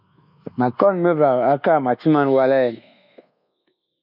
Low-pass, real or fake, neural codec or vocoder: 5.4 kHz; fake; codec, 24 kHz, 1.2 kbps, DualCodec